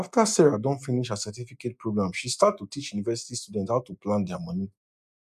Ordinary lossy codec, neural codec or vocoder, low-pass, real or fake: none; none; 14.4 kHz; real